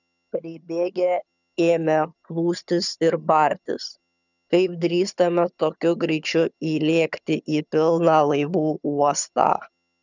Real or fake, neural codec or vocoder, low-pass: fake; vocoder, 22.05 kHz, 80 mel bands, HiFi-GAN; 7.2 kHz